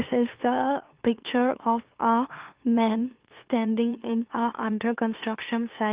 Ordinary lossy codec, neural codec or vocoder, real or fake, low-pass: Opus, 32 kbps; autoencoder, 44.1 kHz, a latent of 192 numbers a frame, MeloTTS; fake; 3.6 kHz